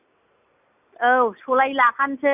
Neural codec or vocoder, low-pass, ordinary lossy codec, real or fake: none; 3.6 kHz; none; real